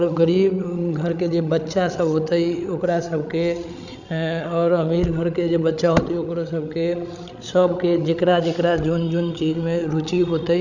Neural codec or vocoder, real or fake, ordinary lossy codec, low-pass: codec, 16 kHz, 16 kbps, FreqCodec, larger model; fake; none; 7.2 kHz